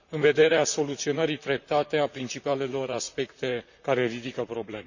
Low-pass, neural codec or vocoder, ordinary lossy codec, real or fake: 7.2 kHz; vocoder, 44.1 kHz, 128 mel bands, Pupu-Vocoder; none; fake